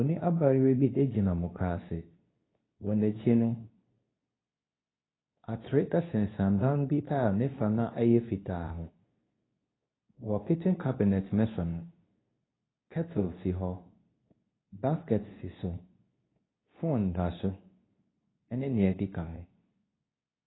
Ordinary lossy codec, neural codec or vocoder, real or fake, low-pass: AAC, 16 kbps; codec, 24 kHz, 0.9 kbps, WavTokenizer, medium speech release version 2; fake; 7.2 kHz